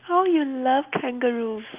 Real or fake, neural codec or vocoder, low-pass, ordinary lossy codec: real; none; 3.6 kHz; Opus, 24 kbps